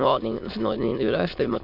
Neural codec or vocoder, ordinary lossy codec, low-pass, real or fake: autoencoder, 22.05 kHz, a latent of 192 numbers a frame, VITS, trained on many speakers; MP3, 48 kbps; 5.4 kHz; fake